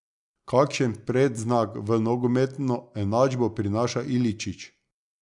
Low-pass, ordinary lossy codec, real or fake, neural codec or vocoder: 10.8 kHz; none; real; none